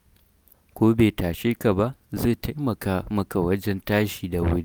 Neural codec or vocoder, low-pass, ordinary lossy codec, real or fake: none; none; none; real